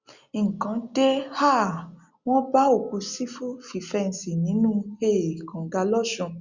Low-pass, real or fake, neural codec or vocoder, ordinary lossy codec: 7.2 kHz; real; none; Opus, 64 kbps